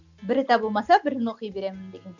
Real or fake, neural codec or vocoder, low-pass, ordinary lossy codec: real; none; 7.2 kHz; none